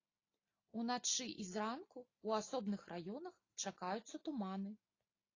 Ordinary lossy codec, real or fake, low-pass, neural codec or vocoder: AAC, 32 kbps; real; 7.2 kHz; none